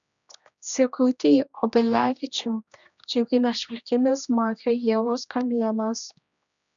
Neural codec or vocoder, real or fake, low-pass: codec, 16 kHz, 1 kbps, X-Codec, HuBERT features, trained on general audio; fake; 7.2 kHz